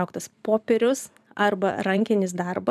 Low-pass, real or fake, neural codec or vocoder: 14.4 kHz; fake; vocoder, 44.1 kHz, 128 mel bands every 512 samples, BigVGAN v2